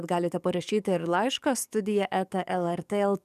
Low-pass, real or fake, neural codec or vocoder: 14.4 kHz; fake; codec, 44.1 kHz, 7.8 kbps, DAC